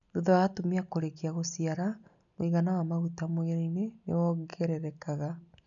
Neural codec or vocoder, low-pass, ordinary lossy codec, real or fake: none; 7.2 kHz; none; real